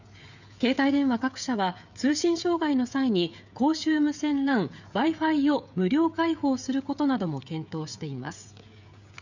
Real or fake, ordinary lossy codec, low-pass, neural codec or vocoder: fake; none; 7.2 kHz; codec, 16 kHz, 16 kbps, FreqCodec, smaller model